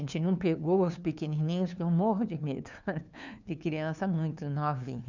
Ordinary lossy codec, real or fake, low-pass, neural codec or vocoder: none; fake; 7.2 kHz; codec, 16 kHz, 2 kbps, FunCodec, trained on LibriTTS, 25 frames a second